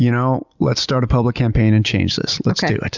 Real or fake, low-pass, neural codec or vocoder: real; 7.2 kHz; none